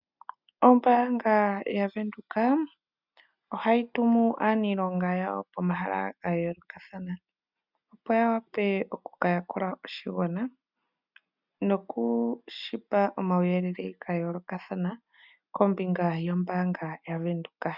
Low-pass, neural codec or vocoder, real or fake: 5.4 kHz; none; real